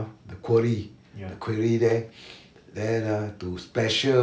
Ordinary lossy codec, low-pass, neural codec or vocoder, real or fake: none; none; none; real